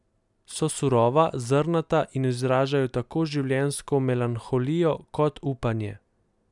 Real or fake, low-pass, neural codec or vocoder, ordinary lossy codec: real; 10.8 kHz; none; none